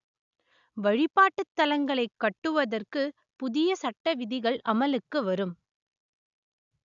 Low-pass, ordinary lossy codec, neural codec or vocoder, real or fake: 7.2 kHz; none; none; real